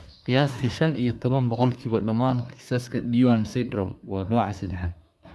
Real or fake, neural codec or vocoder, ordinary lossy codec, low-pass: fake; codec, 24 kHz, 1 kbps, SNAC; none; none